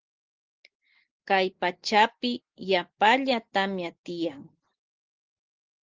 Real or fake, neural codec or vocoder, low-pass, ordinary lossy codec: real; none; 7.2 kHz; Opus, 16 kbps